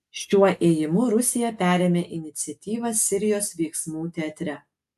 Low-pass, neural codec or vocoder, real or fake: 14.4 kHz; none; real